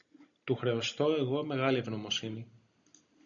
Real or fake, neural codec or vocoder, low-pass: real; none; 7.2 kHz